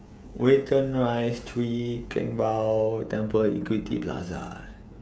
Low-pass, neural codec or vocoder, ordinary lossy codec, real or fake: none; codec, 16 kHz, 16 kbps, FreqCodec, smaller model; none; fake